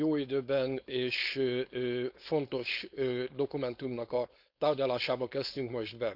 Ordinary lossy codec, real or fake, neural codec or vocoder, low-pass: none; fake; codec, 16 kHz, 4.8 kbps, FACodec; 5.4 kHz